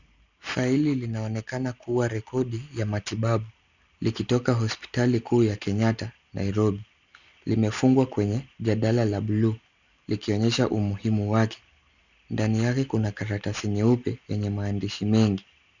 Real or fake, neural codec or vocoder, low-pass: real; none; 7.2 kHz